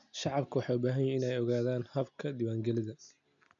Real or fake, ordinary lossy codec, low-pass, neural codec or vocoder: real; none; 7.2 kHz; none